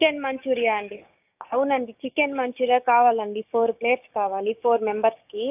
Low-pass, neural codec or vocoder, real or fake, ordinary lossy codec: 3.6 kHz; codec, 16 kHz, 6 kbps, DAC; fake; AAC, 32 kbps